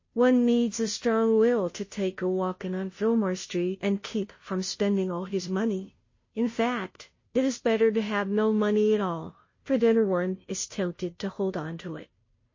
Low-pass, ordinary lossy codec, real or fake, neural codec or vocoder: 7.2 kHz; MP3, 32 kbps; fake; codec, 16 kHz, 0.5 kbps, FunCodec, trained on Chinese and English, 25 frames a second